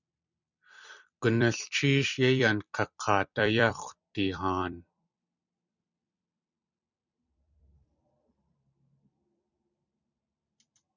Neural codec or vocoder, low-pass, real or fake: vocoder, 44.1 kHz, 128 mel bands every 256 samples, BigVGAN v2; 7.2 kHz; fake